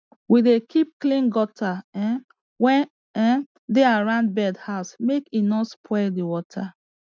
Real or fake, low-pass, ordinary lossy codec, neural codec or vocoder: real; none; none; none